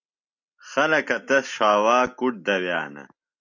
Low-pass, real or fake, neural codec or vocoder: 7.2 kHz; real; none